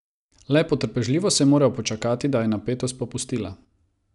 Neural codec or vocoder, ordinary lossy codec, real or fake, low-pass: none; none; real; 10.8 kHz